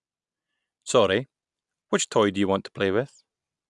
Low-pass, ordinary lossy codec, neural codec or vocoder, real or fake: 10.8 kHz; none; none; real